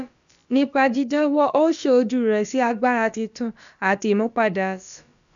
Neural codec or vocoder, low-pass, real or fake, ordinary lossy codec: codec, 16 kHz, about 1 kbps, DyCAST, with the encoder's durations; 7.2 kHz; fake; none